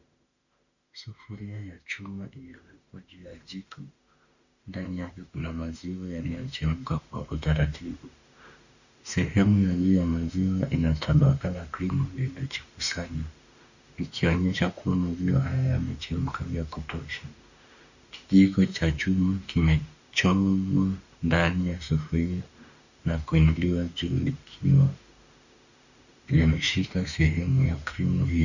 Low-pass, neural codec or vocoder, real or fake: 7.2 kHz; autoencoder, 48 kHz, 32 numbers a frame, DAC-VAE, trained on Japanese speech; fake